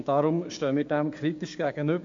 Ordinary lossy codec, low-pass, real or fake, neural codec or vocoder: MP3, 48 kbps; 7.2 kHz; fake; codec, 16 kHz, 6 kbps, DAC